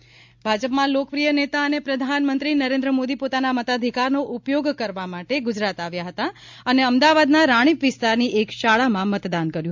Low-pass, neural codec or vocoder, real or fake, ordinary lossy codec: 7.2 kHz; none; real; MP3, 64 kbps